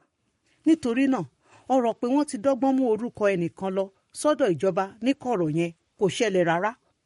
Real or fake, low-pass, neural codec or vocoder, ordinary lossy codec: fake; 19.8 kHz; codec, 44.1 kHz, 7.8 kbps, Pupu-Codec; MP3, 48 kbps